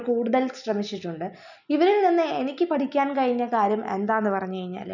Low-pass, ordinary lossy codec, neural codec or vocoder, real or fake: 7.2 kHz; none; none; real